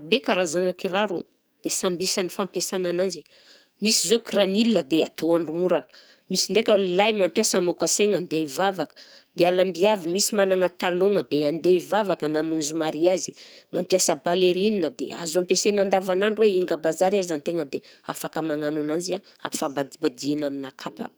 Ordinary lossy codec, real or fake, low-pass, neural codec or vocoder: none; fake; none; codec, 44.1 kHz, 2.6 kbps, SNAC